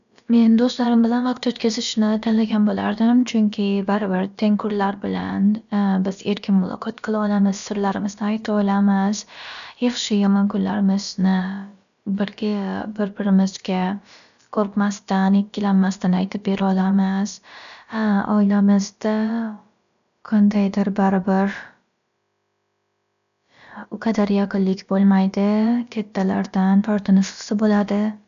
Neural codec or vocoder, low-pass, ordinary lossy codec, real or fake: codec, 16 kHz, about 1 kbps, DyCAST, with the encoder's durations; 7.2 kHz; none; fake